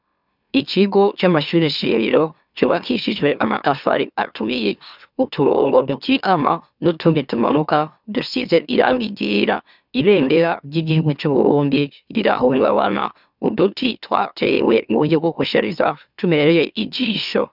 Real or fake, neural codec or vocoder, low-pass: fake; autoencoder, 44.1 kHz, a latent of 192 numbers a frame, MeloTTS; 5.4 kHz